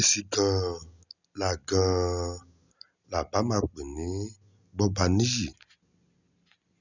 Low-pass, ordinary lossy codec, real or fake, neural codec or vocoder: 7.2 kHz; none; real; none